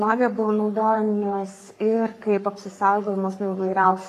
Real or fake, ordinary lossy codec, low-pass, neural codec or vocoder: fake; AAC, 48 kbps; 14.4 kHz; codec, 44.1 kHz, 3.4 kbps, Pupu-Codec